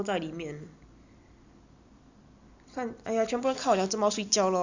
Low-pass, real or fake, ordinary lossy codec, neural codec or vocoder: 7.2 kHz; real; Opus, 64 kbps; none